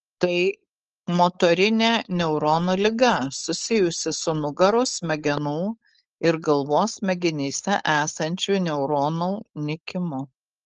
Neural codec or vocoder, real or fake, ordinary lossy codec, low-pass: codec, 16 kHz, 4.8 kbps, FACodec; fake; Opus, 24 kbps; 7.2 kHz